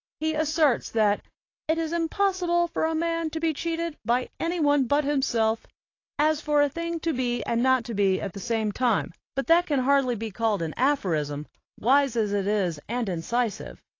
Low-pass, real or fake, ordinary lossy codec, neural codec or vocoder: 7.2 kHz; real; AAC, 32 kbps; none